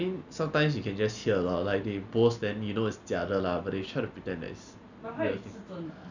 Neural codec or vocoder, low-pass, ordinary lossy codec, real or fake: none; 7.2 kHz; none; real